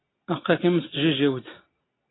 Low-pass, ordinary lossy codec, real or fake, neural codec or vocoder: 7.2 kHz; AAC, 16 kbps; real; none